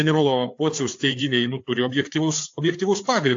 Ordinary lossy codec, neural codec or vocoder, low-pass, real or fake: AAC, 48 kbps; codec, 16 kHz, 4 kbps, FreqCodec, larger model; 7.2 kHz; fake